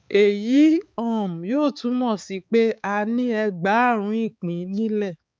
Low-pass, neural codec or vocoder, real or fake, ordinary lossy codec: none; codec, 16 kHz, 4 kbps, X-Codec, HuBERT features, trained on balanced general audio; fake; none